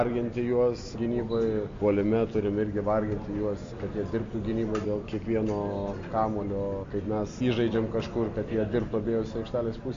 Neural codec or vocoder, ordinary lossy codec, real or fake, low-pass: none; MP3, 48 kbps; real; 7.2 kHz